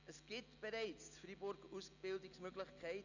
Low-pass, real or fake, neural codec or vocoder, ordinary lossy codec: 7.2 kHz; real; none; none